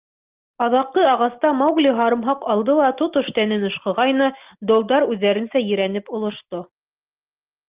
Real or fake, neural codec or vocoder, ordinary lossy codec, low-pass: real; none; Opus, 32 kbps; 3.6 kHz